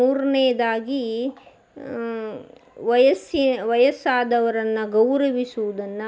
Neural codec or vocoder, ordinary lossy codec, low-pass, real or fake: none; none; none; real